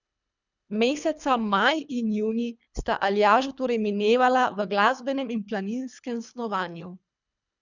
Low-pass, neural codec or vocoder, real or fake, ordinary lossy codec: 7.2 kHz; codec, 24 kHz, 3 kbps, HILCodec; fake; none